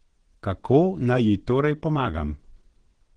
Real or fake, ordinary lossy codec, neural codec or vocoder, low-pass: fake; Opus, 16 kbps; vocoder, 22.05 kHz, 80 mel bands, WaveNeXt; 9.9 kHz